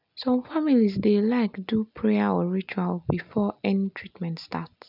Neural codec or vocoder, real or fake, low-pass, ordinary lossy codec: none; real; 5.4 kHz; none